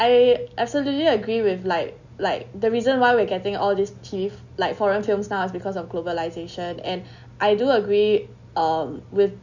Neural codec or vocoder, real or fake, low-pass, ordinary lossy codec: none; real; 7.2 kHz; none